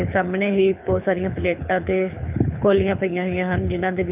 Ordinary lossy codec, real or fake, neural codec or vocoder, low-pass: none; fake; codec, 24 kHz, 6 kbps, HILCodec; 3.6 kHz